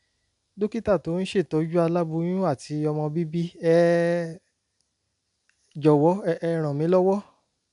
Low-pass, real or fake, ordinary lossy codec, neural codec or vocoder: 10.8 kHz; real; none; none